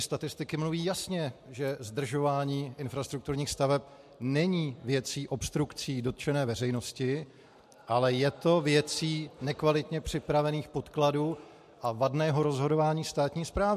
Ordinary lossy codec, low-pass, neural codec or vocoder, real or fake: MP3, 64 kbps; 14.4 kHz; none; real